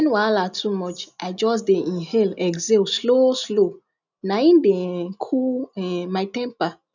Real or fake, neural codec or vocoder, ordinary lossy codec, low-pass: real; none; none; 7.2 kHz